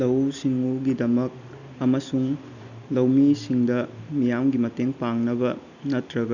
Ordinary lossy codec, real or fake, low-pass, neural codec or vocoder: none; real; 7.2 kHz; none